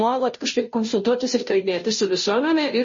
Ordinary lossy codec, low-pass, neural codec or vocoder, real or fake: MP3, 32 kbps; 7.2 kHz; codec, 16 kHz, 0.5 kbps, FunCodec, trained on Chinese and English, 25 frames a second; fake